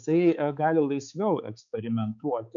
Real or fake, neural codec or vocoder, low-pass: fake; codec, 16 kHz, 4 kbps, X-Codec, HuBERT features, trained on balanced general audio; 7.2 kHz